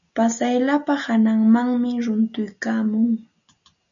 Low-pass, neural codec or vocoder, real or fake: 7.2 kHz; none; real